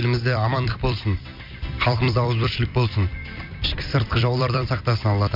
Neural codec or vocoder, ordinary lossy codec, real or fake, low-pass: vocoder, 44.1 kHz, 128 mel bands every 256 samples, BigVGAN v2; MP3, 48 kbps; fake; 5.4 kHz